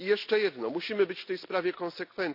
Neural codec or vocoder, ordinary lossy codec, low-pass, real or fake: none; none; 5.4 kHz; real